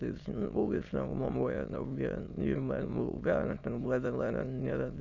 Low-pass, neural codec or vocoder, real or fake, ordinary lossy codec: 7.2 kHz; autoencoder, 22.05 kHz, a latent of 192 numbers a frame, VITS, trained on many speakers; fake; none